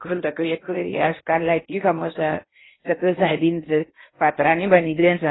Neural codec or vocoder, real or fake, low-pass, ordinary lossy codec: codec, 16 kHz in and 24 kHz out, 0.8 kbps, FocalCodec, streaming, 65536 codes; fake; 7.2 kHz; AAC, 16 kbps